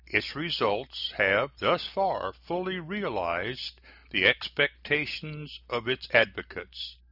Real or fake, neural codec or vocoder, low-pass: real; none; 5.4 kHz